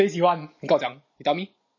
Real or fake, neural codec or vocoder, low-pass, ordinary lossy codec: real; none; 7.2 kHz; MP3, 32 kbps